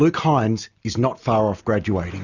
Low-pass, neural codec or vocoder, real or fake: 7.2 kHz; none; real